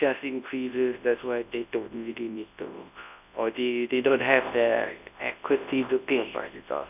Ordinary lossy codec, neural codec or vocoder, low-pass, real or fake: none; codec, 24 kHz, 0.9 kbps, WavTokenizer, large speech release; 3.6 kHz; fake